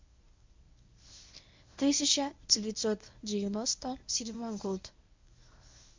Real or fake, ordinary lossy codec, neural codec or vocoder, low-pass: fake; MP3, 64 kbps; codec, 24 kHz, 0.9 kbps, WavTokenizer, medium speech release version 1; 7.2 kHz